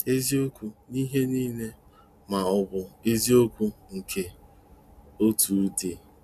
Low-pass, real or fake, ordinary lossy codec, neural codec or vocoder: 14.4 kHz; real; none; none